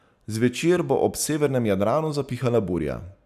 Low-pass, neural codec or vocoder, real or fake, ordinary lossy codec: 14.4 kHz; none; real; none